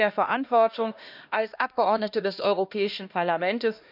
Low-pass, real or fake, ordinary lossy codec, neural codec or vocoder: 5.4 kHz; fake; none; codec, 16 kHz, 1 kbps, X-Codec, HuBERT features, trained on LibriSpeech